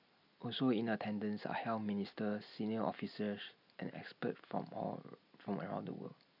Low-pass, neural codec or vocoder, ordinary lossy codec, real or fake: 5.4 kHz; none; none; real